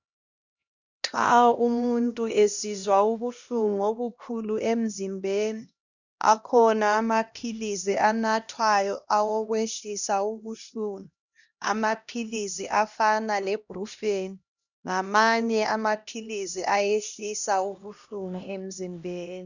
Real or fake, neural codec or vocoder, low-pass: fake; codec, 16 kHz, 1 kbps, X-Codec, HuBERT features, trained on LibriSpeech; 7.2 kHz